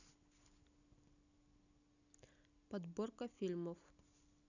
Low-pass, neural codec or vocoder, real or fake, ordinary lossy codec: 7.2 kHz; none; real; none